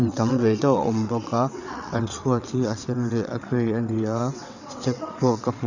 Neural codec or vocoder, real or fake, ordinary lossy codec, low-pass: vocoder, 22.05 kHz, 80 mel bands, WaveNeXt; fake; none; 7.2 kHz